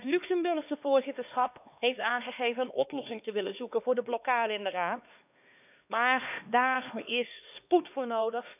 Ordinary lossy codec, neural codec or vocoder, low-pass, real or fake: none; codec, 16 kHz, 2 kbps, X-Codec, HuBERT features, trained on LibriSpeech; 3.6 kHz; fake